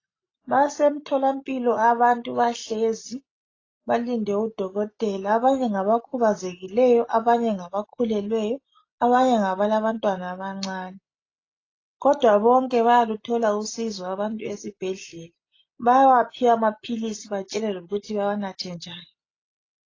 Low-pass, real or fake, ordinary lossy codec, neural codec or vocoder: 7.2 kHz; real; AAC, 32 kbps; none